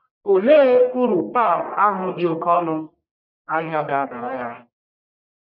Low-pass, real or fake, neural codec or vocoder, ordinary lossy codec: 5.4 kHz; fake; codec, 44.1 kHz, 1.7 kbps, Pupu-Codec; none